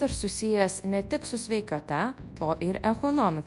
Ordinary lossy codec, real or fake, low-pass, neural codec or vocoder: MP3, 64 kbps; fake; 10.8 kHz; codec, 24 kHz, 0.9 kbps, WavTokenizer, large speech release